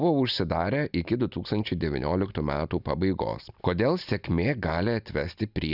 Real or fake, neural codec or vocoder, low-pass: real; none; 5.4 kHz